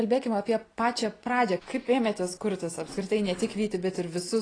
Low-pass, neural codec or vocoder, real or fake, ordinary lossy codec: 9.9 kHz; none; real; AAC, 32 kbps